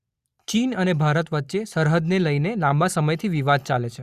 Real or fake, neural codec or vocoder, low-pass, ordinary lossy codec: real; none; 14.4 kHz; Opus, 64 kbps